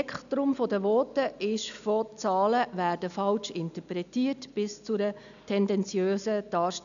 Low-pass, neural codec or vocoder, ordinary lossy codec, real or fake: 7.2 kHz; none; none; real